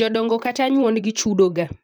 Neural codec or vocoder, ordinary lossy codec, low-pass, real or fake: vocoder, 44.1 kHz, 128 mel bands, Pupu-Vocoder; none; none; fake